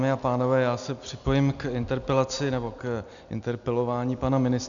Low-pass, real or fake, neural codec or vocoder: 7.2 kHz; real; none